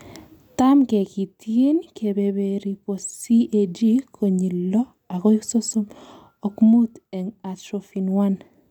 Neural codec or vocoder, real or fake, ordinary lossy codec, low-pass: none; real; none; 19.8 kHz